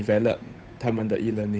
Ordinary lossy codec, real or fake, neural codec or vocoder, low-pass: none; fake; codec, 16 kHz, 8 kbps, FunCodec, trained on Chinese and English, 25 frames a second; none